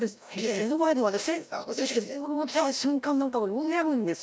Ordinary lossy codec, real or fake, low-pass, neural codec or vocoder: none; fake; none; codec, 16 kHz, 0.5 kbps, FreqCodec, larger model